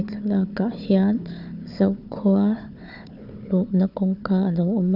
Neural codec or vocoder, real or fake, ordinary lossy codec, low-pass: codec, 16 kHz, 4 kbps, FunCodec, trained on Chinese and English, 50 frames a second; fake; none; 5.4 kHz